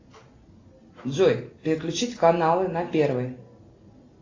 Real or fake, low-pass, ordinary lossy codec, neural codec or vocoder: real; 7.2 kHz; AAC, 32 kbps; none